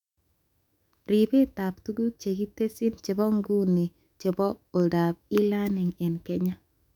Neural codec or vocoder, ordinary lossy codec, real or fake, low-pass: autoencoder, 48 kHz, 128 numbers a frame, DAC-VAE, trained on Japanese speech; none; fake; 19.8 kHz